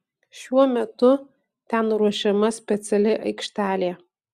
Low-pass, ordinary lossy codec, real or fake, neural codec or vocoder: 14.4 kHz; Opus, 64 kbps; real; none